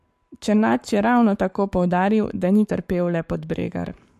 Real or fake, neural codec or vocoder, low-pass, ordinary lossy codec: fake; codec, 44.1 kHz, 7.8 kbps, Pupu-Codec; 14.4 kHz; MP3, 64 kbps